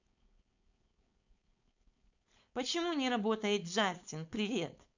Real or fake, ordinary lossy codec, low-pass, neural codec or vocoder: fake; none; 7.2 kHz; codec, 16 kHz, 4.8 kbps, FACodec